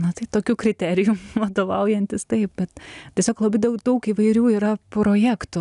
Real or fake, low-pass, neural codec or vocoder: real; 10.8 kHz; none